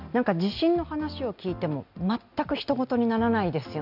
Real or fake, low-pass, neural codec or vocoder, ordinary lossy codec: real; 5.4 kHz; none; none